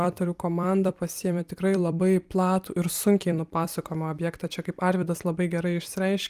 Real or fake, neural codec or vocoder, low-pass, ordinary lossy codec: fake; vocoder, 44.1 kHz, 128 mel bands every 256 samples, BigVGAN v2; 14.4 kHz; Opus, 32 kbps